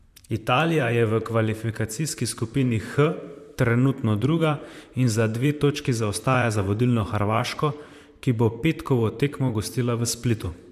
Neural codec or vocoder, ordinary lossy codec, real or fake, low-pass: vocoder, 44.1 kHz, 128 mel bands, Pupu-Vocoder; MP3, 96 kbps; fake; 14.4 kHz